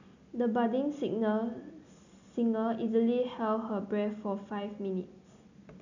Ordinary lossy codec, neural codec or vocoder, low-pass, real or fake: none; none; 7.2 kHz; real